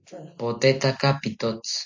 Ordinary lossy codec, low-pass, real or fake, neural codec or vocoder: AAC, 48 kbps; 7.2 kHz; real; none